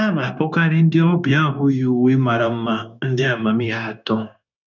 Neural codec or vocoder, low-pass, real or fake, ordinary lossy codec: codec, 16 kHz, 0.9 kbps, LongCat-Audio-Codec; 7.2 kHz; fake; none